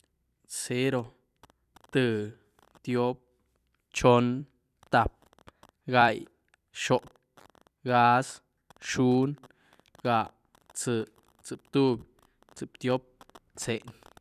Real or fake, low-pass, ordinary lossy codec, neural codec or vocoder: fake; 14.4 kHz; none; vocoder, 44.1 kHz, 128 mel bands every 256 samples, BigVGAN v2